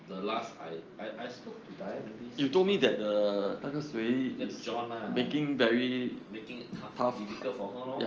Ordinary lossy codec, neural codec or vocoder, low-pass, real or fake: Opus, 24 kbps; none; 7.2 kHz; real